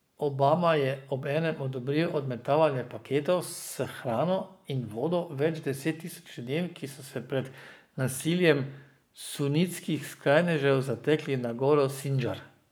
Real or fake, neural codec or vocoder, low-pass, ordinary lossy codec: fake; codec, 44.1 kHz, 7.8 kbps, Pupu-Codec; none; none